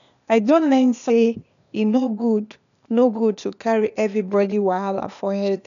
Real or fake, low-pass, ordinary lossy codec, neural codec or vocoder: fake; 7.2 kHz; none; codec, 16 kHz, 0.8 kbps, ZipCodec